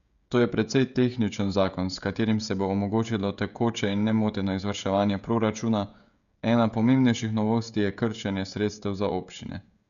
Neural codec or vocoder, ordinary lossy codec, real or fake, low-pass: codec, 16 kHz, 16 kbps, FreqCodec, smaller model; none; fake; 7.2 kHz